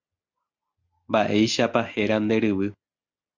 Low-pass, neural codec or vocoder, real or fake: 7.2 kHz; none; real